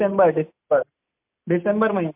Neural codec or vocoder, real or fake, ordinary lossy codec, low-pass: none; real; MP3, 32 kbps; 3.6 kHz